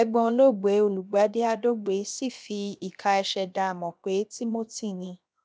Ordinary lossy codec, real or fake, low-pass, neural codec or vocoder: none; fake; none; codec, 16 kHz, 0.7 kbps, FocalCodec